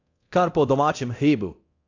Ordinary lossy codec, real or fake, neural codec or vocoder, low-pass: AAC, 48 kbps; fake; codec, 24 kHz, 0.9 kbps, DualCodec; 7.2 kHz